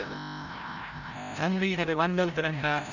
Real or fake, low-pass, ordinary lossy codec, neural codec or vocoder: fake; 7.2 kHz; none; codec, 16 kHz, 0.5 kbps, FreqCodec, larger model